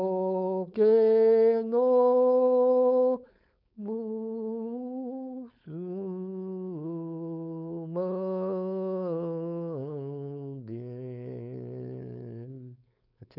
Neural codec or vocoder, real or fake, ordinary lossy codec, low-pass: codec, 16 kHz, 4.8 kbps, FACodec; fake; none; 5.4 kHz